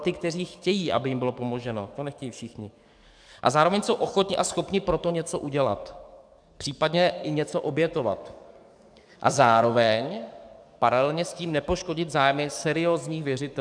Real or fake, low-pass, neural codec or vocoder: fake; 9.9 kHz; codec, 44.1 kHz, 7.8 kbps, DAC